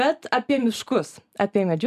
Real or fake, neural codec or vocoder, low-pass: real; none; 14.4 kHz